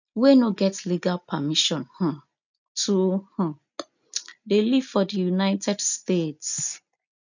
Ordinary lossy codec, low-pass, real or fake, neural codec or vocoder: none; 7.2 kHz; real; none